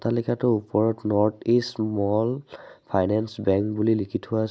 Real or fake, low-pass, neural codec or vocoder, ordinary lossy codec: real; none; none; none